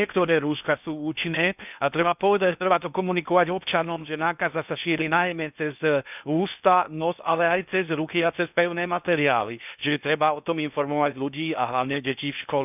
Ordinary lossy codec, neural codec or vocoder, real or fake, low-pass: none; codec, 16 kHz in and 24 kHz out, 0.6 kbps, FocalCodec, streaming, 4096 codes; fake; 3.6 kHz